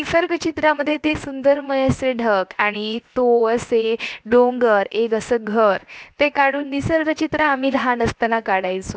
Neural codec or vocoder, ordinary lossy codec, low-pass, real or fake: codec, 16 kHz, 0.7 kbps, FocalCodec; none; none; fake